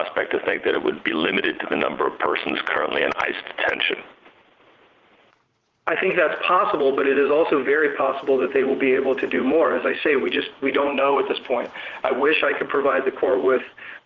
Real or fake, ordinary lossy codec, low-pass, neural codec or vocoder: fake; Opus, 16 kbps; 7.2 kHz; vocoder, 44.1 kHz, 80 mel bands, Vocos